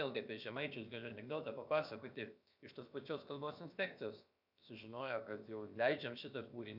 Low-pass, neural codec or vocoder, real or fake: 5.4 kHz; codec, 16 kHz, about 1 kbps, DyCAST, with the encoder's durations; fake